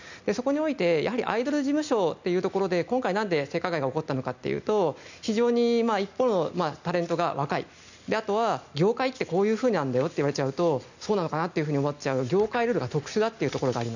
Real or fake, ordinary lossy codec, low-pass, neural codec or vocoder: real; none; 7.2 kHz; none